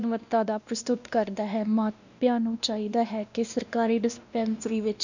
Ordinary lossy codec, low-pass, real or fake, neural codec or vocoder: none; 7.2 kHz; fake; codec, 16 kHz, 1 kbps, X-Codec, WavLM features, trained on Multilingual LibriSpeech